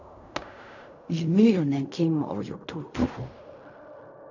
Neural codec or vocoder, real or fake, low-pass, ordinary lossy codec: codec, 16 kHz in and 24 kHz out, 0.4 kbps, LongCat-Audio-Codec, fine tuned four codebook decoder; fake; 7.2 kHz; none